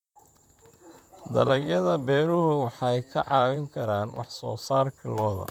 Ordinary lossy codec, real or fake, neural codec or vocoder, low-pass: MP3, 96 kbps; fake; vocoder, 44.1 kHz, 128 mel bands, Pupu-Vocoder; 19.8 kHz